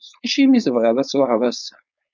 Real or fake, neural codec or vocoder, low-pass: fake; codec, 16 kHz, 4.8 kbps, FACodec; 7.2 kHz